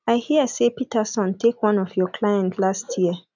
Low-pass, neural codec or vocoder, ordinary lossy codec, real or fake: 7.2 kHz; none; none; real